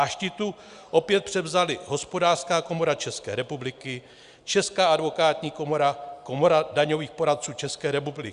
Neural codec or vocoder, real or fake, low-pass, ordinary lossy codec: none; real; 10.8 kHz; Opus, 64 kbps